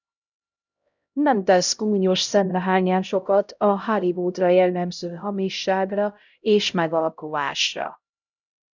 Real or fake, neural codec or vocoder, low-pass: fake; codec, 16 kHz, 0.5 kbps, X-Codec, HuBERT features, trained on LibriSpeech; 7.2 kHz